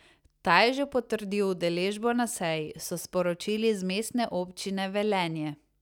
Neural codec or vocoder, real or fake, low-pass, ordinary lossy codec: none; real; 19.8 kHz; none